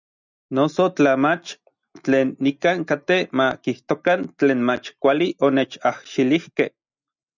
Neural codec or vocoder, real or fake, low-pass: none; real; 7.2 kHz